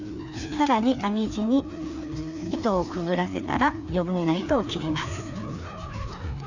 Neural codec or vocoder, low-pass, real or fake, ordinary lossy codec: codec, 16 kHz, 2 kbps, FreqCodec, larger model; 7.2 kHz; fake; none